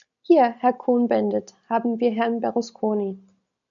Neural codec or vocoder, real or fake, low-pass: none; real; 7.2 kHz